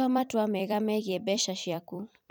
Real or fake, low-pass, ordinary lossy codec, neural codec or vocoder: fake; none; none; vocoder, 44.1 kHz, 128 mel bands every 256 samples, BigVGAN v2